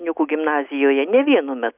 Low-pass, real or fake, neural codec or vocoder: 3.6 kHz; real; none